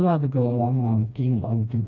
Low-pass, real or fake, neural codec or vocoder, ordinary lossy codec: 7.2 kHz; fake; codec, 16 kHz, 1 kbps, FreqCodec, smaller model; none